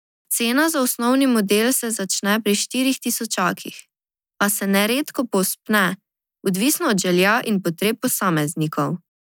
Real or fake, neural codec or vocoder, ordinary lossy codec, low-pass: real; none; none; none